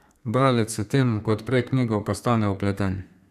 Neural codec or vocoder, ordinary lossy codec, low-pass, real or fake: codec, 32 kHz, 1.9 kbps, SNAC; none; 14.4 kHz; fake